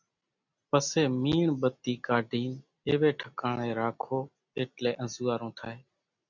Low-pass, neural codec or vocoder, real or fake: 7.2 kHz; none; real